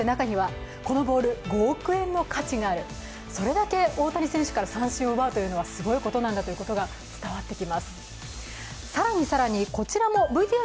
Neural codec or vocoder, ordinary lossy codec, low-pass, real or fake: none; none; none; real